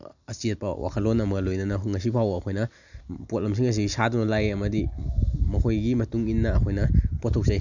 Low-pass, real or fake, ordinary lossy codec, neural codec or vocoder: 7.2 kHz; real; none; none